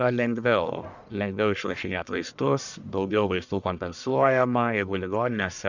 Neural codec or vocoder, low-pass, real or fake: codec, 44.1 kHz, 1.7 kbps, Pupu-Codec; 7.2 kHz; fake